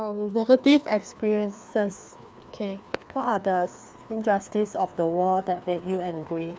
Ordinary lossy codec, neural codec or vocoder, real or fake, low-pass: none; codec, 16 kHz, 2 kbps, FreqCodec, larger model; fake; none